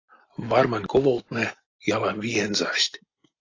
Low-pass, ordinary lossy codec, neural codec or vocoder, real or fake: 7.2 kHz; AAC, 32 kbps; none; real